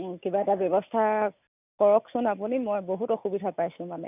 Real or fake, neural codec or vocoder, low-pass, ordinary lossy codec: real; none; 3.6 kHz; none